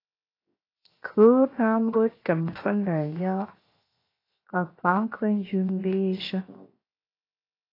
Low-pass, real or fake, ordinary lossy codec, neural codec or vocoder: 5.4 kHz; fake; AAC, 24 kbps; codec, 16 kHz, 0.7 kbps, FocalCodec